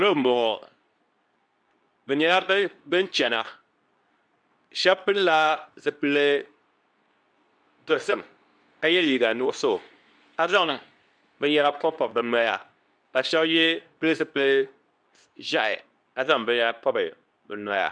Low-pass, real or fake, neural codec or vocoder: 9.9 kHz; fake; codec, 24 kHz, 0.9 kbps, WavTokenizer, medium speech release version 2